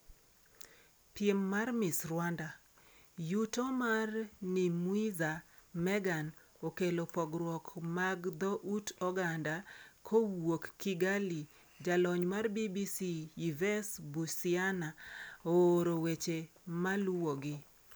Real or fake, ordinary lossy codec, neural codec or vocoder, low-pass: real; none; none; none